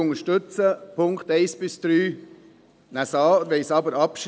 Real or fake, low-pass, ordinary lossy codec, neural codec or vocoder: real; none; none; none